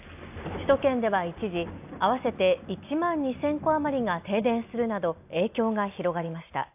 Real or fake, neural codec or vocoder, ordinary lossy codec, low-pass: real; none; AAC, 32 kbps; 3.6 kHz